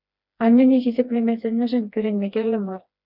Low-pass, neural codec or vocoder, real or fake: 5.4 kHz; codec, 16 kHz, 2 kbps, FreqCodec, smaller model; fake